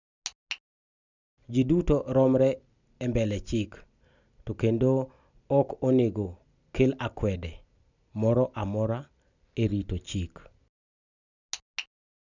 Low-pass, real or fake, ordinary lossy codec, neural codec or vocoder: 7.2 kHz; real; none; none